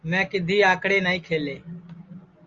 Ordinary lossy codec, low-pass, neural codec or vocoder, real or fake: Opus, 32 kbps; 7.2 kHz; none; real